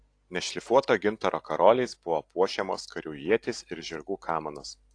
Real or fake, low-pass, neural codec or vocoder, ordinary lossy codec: real; 9.9 kHz; none; AAC, 48 kbps